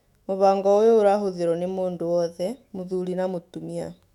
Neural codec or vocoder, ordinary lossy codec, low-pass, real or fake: autoencoder, 48 kHz, 128 numbers a frame, DAC-VAE, trained on Japanese speech; none; 19.8 kHz; fake